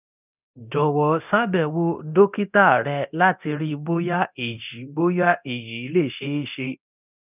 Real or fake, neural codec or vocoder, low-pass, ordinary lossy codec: fake; codec, 24 kHz, 0.9 kbps, DualCodec; 3.6 kHz; none